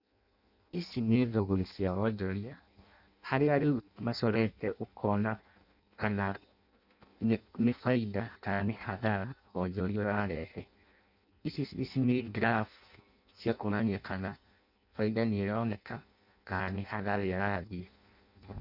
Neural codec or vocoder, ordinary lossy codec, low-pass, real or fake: codec, 16 kHz in and 24 kHz out, 0.6 kbps, FireRedTTS-2 codec; none; 5.4 kHz; fake